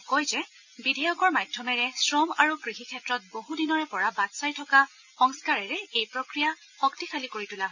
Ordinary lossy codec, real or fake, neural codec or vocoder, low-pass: none; real; none; 7.2 kHz